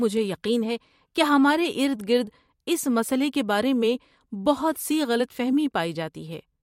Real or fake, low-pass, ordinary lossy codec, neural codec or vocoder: fake; 19.8 kHz; MP3, 64 kbps; vocoder, 44.1 kHz, 128 mel bands every 256 samples, BigVGAN v2